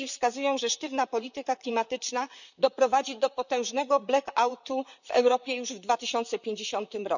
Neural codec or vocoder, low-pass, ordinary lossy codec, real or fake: vocoder, 44.1 kHz, 128 mel bands, Pupu-Vocoder; 7.2 kHz; none; fake